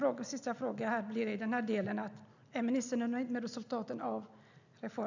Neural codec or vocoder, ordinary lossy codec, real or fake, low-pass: none; none; real; 7.2 kHz